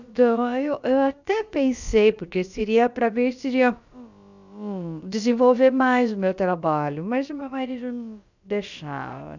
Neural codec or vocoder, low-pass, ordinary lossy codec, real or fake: codec, 16 kHz, about 1 kbps, DyCAST, with the encoder's durations; 7.2 kHz; none; fake